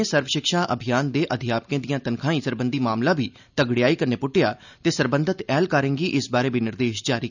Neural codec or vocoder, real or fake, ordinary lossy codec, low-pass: none; real; none; 7.2 kHz